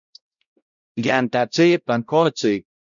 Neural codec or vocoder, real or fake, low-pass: codec, 16 kHz, 0.5 kbps, X-Codec, WavLM features, trained on Multilingual LibriSpeech; fake; 7.2 kHz